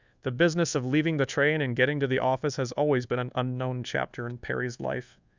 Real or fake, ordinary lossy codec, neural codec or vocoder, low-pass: fake; Opus, 64 kbps; codec, 24 kHz, 1.2 kbps, DualCodec; 7.2 kHz